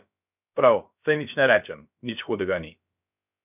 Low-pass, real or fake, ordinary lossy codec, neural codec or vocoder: 3.6 kHz; fake; none; codec, 16 kHz, about 1 kbps, DyCAST, with the encoder's durations